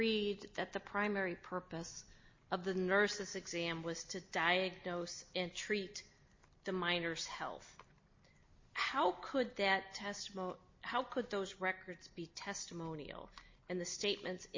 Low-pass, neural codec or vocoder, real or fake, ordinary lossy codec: 7.2 kHz; none; real; MP3, 32 kbps